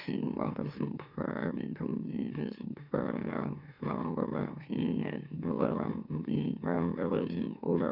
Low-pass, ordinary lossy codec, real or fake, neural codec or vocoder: 5.4 kHz; none; fake; autoencoder, 44.1 kHz, a latent of 192 numbers a frame, MeloTTS